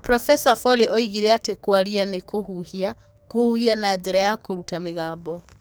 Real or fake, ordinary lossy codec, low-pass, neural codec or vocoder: fake; none; none; codec, 44.1 kHz, 2.6 kbps, SNAC